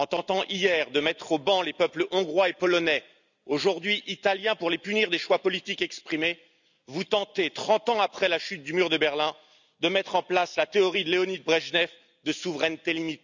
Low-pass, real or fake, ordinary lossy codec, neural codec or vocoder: 7.2 kHz; real; none; none